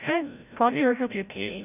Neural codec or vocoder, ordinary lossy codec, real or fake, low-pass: codec, 16 kHz, 0.5 kbps, FreqCodec, larger model; none; fake; 3.6 kHz